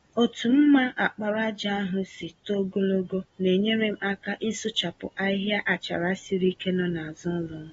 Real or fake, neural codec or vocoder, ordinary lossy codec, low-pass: real; none; AAC, 24 kbps; 19.8 kHz